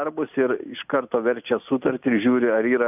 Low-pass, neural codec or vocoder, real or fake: 3.6 kHz; none; real